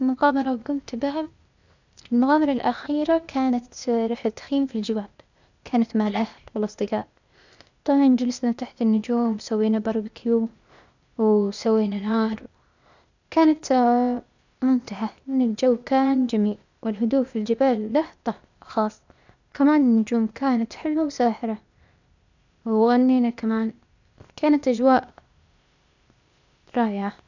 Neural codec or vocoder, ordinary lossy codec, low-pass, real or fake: codec, 16 kHz, 0.8 kbps, ZipCodec; none; 7.2 kHz; fake